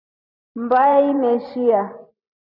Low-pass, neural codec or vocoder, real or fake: 5.4 kHz; vocoder, 44.1 kHz, 128 mel bands every 512 samples, BigVGAN v2; fake